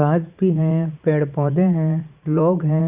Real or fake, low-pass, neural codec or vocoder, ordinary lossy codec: fake; 3.6 kHz; vocoder, 44.1 kHz, 80 mel bands, Vocos; Opus, 64 kbps